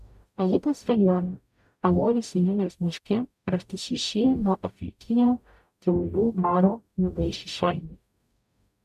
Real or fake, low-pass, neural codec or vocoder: fake; 14.4 kHz; codec, 44.1 kHz, 0.9 kbps, DAC